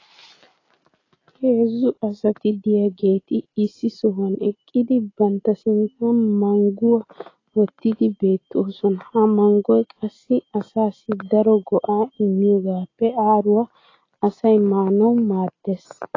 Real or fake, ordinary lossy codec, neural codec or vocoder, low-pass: real; AAC, 32 kbps; none; 7.2 kHz